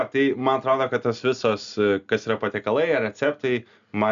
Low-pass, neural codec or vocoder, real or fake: 7.2 kHz; none; real